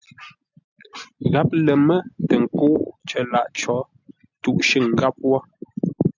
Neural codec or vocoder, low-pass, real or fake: none; 7.2 kHz; real